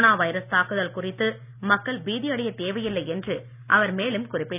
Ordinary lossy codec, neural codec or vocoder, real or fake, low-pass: none; none; real; 3.6 kHz